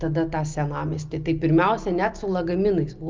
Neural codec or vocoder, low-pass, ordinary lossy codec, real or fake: none; 7.2 kHz; Opus, 24 kbps; real